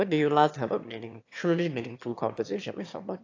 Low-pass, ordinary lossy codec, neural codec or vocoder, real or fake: 7.2 kHz; AAC, 48 kbps; autoencoder, 22.05 kHz, a latent of 192 numbers a frame, VITS, trained on one speaker; fake